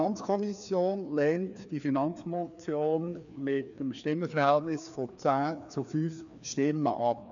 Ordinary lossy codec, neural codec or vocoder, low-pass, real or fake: none; codec, 16 kHz, 2 kbps, FreqCodec, larger model; 7.2 kHz; fake